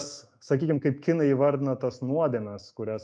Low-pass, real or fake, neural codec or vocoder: 9.9 kHz; fake; autoencoder, 48 kHz, 128 numbers a frame, DAC-VAE, trained on Japanese speech